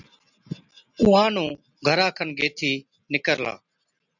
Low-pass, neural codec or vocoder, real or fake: 7.2 kHz; none; real